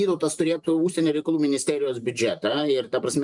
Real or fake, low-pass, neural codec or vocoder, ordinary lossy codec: fake; 10.8 kHz; vocoder, 44.1 kHz, 128 mel bands every 512 samples, BigVGAN v2; MP3, 96 kbps